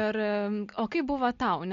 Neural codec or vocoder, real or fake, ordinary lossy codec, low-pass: none; real; MP3, 48 kbps; 7.2 kHz